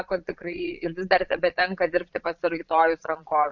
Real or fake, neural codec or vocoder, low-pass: fake; vocoder, 22.05 kHz, 80 mel bands, Vocos; 7.2 kHz